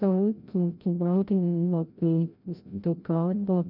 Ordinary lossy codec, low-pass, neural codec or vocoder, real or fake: none; 5.4 kHz; codec, 16 kHz, 0.5 kbps, FreqCodec, larger model; fake